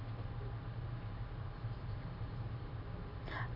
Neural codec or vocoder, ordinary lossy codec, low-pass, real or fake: vocoder, 44.1 kHz, 128 mel bands every 512 samples, BigVGAN v2; none; 5.4 kHz; fake